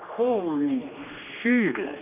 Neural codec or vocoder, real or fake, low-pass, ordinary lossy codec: codec, 16 kHz, 2 kbps, X-Codec, HuBERT features, trained on general audio; fake; 3.6 kHz; none